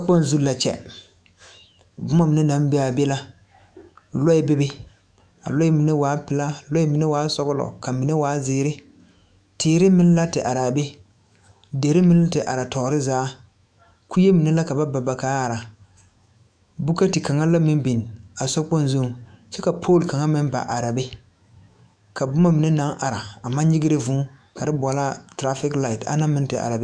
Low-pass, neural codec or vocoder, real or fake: 9.9 kHz; autoencoder, 48 kHz, 128 numbers a frame, DAC-VAE, trained on Japanese speech; fake